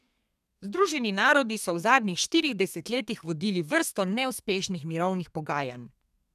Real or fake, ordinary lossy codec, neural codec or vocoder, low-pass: fake; none; codec, 32 kHz, 1.9 kbps, SNAC; 14.4 kHz